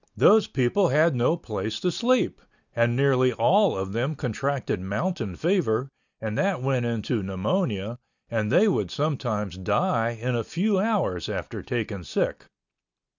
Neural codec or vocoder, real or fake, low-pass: none; real; 7.2 kHz